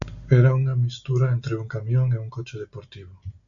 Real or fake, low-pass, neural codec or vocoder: real; 7.2 kHz; none